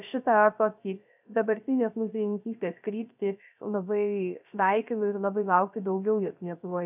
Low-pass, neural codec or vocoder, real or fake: 3.6 kHz; codec, 16 kHz, 0.3 kbps, FocalCodec; fake